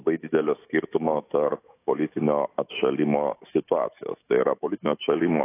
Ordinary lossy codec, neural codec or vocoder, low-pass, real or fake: AAC, 24 kbps; autoencoder, 48 kHz, 128 numbers a frame, DAC-VAE, trained on Japanese speech; 3.6 kHz; fake